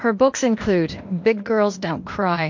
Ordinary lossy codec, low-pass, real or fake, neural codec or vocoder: MP3, 48 kbps; 7.2 kHz; fake; codec, 16 kHz, 0.8 kbps, ZipCodec